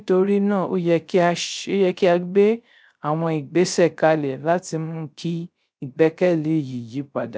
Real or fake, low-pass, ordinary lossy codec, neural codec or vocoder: fake; none; none; codec, 16 kHz, 0.3 kbps, FocalCodec